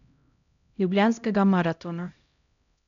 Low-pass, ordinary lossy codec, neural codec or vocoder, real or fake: 7.2 kHz; none; codec, 16 kHz, 0.5 kbps, X-Codec, HuBERT features, trained on LibriSpeech; fake